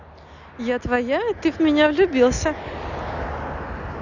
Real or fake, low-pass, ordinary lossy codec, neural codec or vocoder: real; 7.2 kHz; none; none